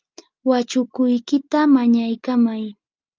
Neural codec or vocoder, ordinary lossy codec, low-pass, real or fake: none; Opus, 24 kbps; 7.2 kHz; real